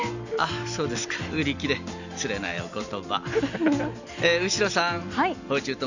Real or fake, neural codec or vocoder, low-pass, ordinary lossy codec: real; none; 7.2 kHz; none